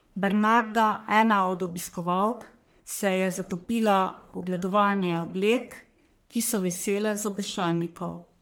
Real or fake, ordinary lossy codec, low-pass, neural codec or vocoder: fake; none; none; codec, 44.1 kHz, 1.7 kbps, Pupu-Codec